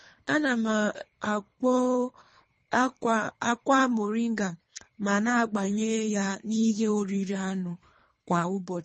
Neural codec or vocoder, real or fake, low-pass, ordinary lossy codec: codec, 24 kHz, 3 kbps, HILCodec; fake; 10.8 kHz; MP3, 32 kbps